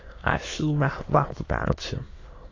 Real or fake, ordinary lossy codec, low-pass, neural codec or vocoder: fake; AAC, 32 kbps; 7.2 kHz; autoencoder, 22.05 kHz, a latent of 192 numbers a frame, VITS, trained on many speakers